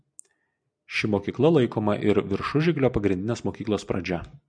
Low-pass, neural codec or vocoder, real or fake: 9.9 kHz; none; real